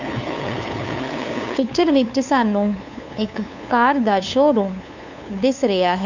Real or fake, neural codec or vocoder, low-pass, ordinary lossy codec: fake; codec, 16 kHz, 2 kbps, FunCodec, trained on LibriTTS, 25 frames a second; 7.2 kHz; none